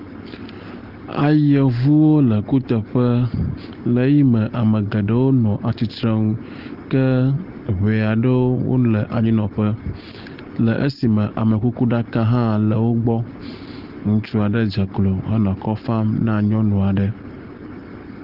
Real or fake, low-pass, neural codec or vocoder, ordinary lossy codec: real; 5.4 kHz; none; Opus, 16 kbps